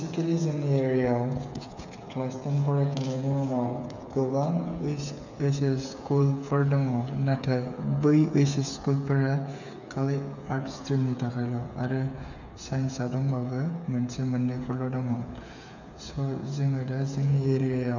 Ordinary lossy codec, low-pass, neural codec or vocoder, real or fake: none; 7.2 kHz; codec, 16 kHz, 8 kbps, FreqCodec, smaller model; fake